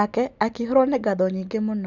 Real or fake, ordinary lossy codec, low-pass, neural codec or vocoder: real; none; 7.2 kHz; none